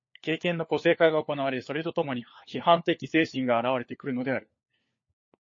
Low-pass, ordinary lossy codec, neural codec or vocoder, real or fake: 7.2 kHz; MP3, 32 kbps; codec, 16 kHz, 4 kbps, FunCodec, trained on LibriTTS, 50 frames a second; fake